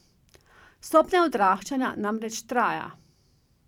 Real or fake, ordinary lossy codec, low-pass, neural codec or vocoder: fake; none; 19.8 kHz; vocoder, 44.1 kHz, 128 mel bands every 512 samples, BigVGAN v2